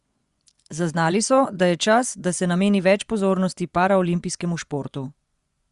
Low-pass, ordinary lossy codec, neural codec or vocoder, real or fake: 10.8 kHz; Opus, 64 kbps; vocoder, 24 kHz, 100 mel bands, Vocos; fake